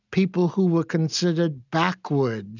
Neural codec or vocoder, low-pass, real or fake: none; 7.2 kHz; real